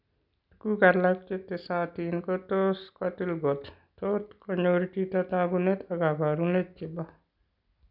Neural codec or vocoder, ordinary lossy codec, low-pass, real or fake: none; none; 5.4 kHz; real